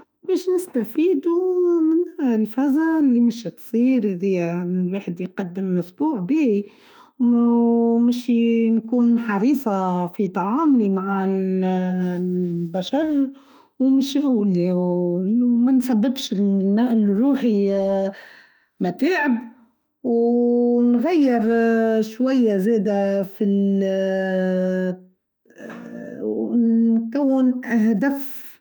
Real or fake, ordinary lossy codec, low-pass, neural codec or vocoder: fake; none; none; autoencoder, 48 kHz, 32 numbers a frame, DAC-VAE, trained on Japanese speech